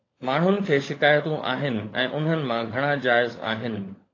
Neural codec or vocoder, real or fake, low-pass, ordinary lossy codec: codec, 16 kHz, 16 kbps, FunCodec, trained on LibriTTS, 50 frames a second; fake; 7.2 kHz; AAC, 32 kbps